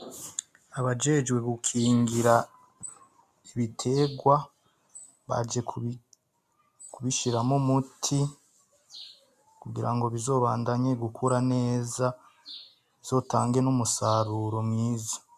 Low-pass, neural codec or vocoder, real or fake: 14.4 kHz; none; real